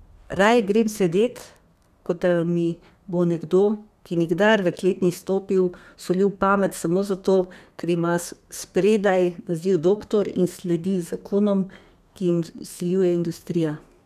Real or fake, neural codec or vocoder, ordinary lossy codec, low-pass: fake; codec, 32 kHz, 1.9 kbps, SNAC; none; 14.4 kHz